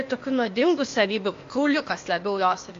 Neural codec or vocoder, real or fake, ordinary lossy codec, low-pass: codec, 16 kHz, 0.8 kbps, ZipCodec; fake; AAC, 48 kbps; 7.2 kHz